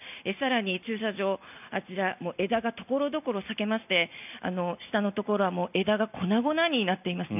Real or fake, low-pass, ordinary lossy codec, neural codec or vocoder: real; 3.6 kHz; none; none